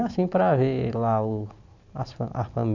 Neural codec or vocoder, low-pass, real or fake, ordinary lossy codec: none; 7.2 kHz; real; none